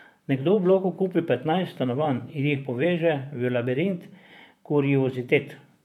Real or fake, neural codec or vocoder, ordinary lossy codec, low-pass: fake; vocoder, 44.1 kHz, 128 mel bands, Pupu-Vocoder; none; 19.8 kHz